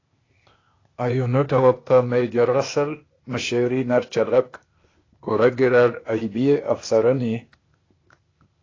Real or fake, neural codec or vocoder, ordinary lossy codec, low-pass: fake; codec, 16 kHz, 0.8 kbps, ZipCodec; AAC, 32 kbps; 7.2 kHz